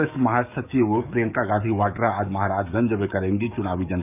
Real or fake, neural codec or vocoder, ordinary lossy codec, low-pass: fake; codec, 44.1 kHz, 7.8 kbps, DAC; none; 3.6 kHz